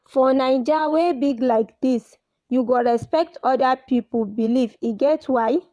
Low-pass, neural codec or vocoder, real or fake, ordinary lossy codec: none; vocoder, 22.05 kHz, 80 mel bands, WaveNeXt; fake; none